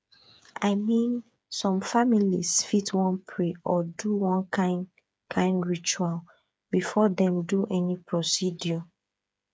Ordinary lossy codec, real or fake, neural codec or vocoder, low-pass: none; fake; codec, 16 kHz, 8 kbps, FreqCodec, smaller model; none